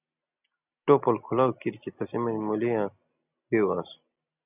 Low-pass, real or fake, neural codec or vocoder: 3.6 kHz; real; none